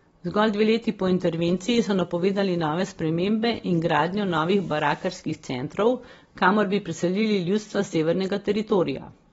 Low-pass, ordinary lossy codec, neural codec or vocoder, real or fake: 10.8 kHz; AAC, 24 kbps; none; real